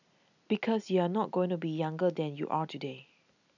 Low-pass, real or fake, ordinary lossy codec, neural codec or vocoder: 7.2 kHz; real; none; none